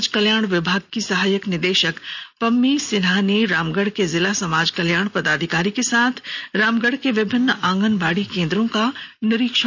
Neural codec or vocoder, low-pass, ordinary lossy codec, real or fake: none; none; none; real